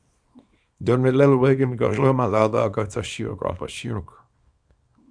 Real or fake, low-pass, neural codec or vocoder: fake; 9.9 kHz; codec, 24 kHz, 0.9 kbps, WavTokenizer, small release